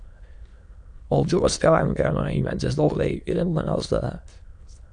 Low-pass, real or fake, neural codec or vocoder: 9.9 kHz; fake; autoencoder, 22.05 kHz, a latent of 192 numbers a frame, VITS, trained on many speakers